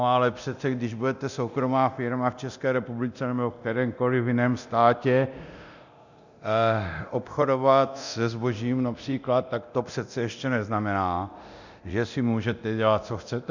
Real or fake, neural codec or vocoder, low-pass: fake; codec, 24 kHz, 0.9 kbps, DualCodec; 7.2 kHz